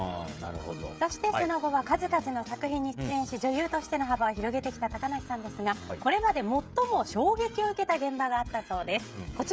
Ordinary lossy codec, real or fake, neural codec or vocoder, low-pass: none; fake; codec, 16 kHz, 16 kbps, FreqCodec, smaller model; none